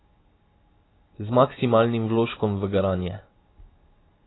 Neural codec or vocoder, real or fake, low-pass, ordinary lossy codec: none; real; 7.2 kHz; AAC, 16 kbps